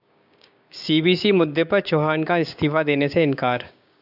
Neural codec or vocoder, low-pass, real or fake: codec, 16 kHz, 6 kbps, DAC; 5.4 kHz; fake